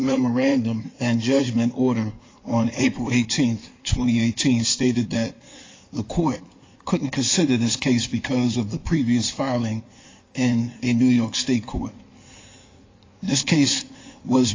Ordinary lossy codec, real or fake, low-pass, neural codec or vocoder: AAC, 32 kbps; fake; 7.2 kHz; codec, 16 kHz in and 24 kHz out, 2.2 kbps, FireRedTTS-2 codec